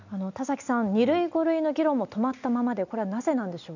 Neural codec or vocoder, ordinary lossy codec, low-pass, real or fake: none; none; 7.2 kHz; real